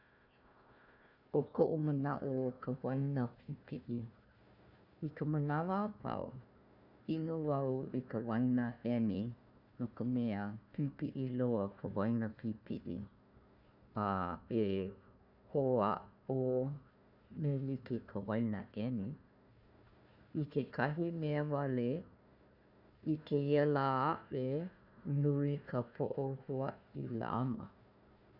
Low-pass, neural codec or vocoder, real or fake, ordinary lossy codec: 5.4 kHz; codec, 16 kHz, 1 kbps, FunCodec, trained on Chinese and English, 50 frames a second; fake; none